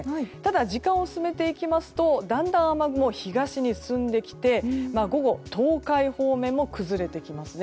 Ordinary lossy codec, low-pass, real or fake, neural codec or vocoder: none; none; real; none